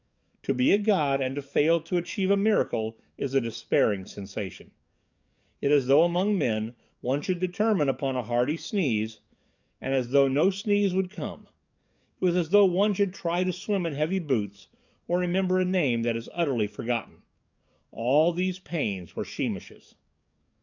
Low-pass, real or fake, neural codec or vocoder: 7.2 kHz; fake; codec, 44.1 kHz, 7.8 kbps, DAC